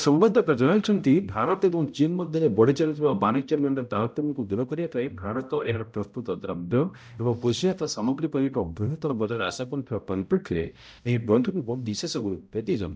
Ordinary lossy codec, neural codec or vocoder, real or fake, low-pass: none; codec, 16 kHz, 0.5 kbps, X-Codec, HuBERT features, trained on balanced general audio; fake; none